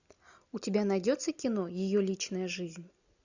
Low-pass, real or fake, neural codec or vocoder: 7.2 kHz; real; none